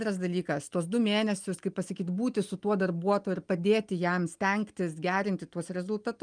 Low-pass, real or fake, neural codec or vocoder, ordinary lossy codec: 9.9 kHz; real; none; Opus, 32 kbps